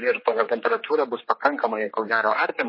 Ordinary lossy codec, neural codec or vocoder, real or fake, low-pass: MP3, 24 kbps; codec, 16 kHz, 4 kbps, X-Codec, HuBERT features, trained on general audio; fake; 5.4 kHz